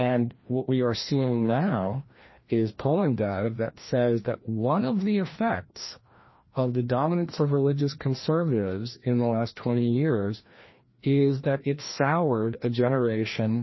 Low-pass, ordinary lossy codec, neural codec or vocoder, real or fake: 7.2 kHz; MP3, 24 kbps; codec, 16 kHz, 1 kbps, FreqCodec, larger model; fake